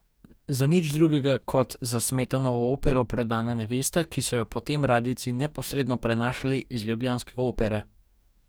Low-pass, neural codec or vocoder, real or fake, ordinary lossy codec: none; codec, 44.1 kHz, 2.6 kbps, DAC; fake; none